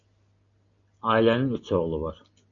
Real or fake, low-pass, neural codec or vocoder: real; 7.2 kHz; none